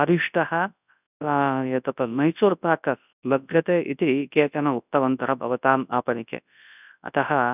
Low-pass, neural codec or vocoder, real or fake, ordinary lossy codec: 3.6 kHz; codec, 24 kHz, 0.9 kbps, WavTokenizer, large speech release; fake; none